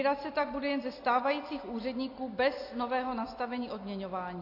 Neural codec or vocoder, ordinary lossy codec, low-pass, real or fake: none; AAC, 32 kbps; 5.4 kHz; real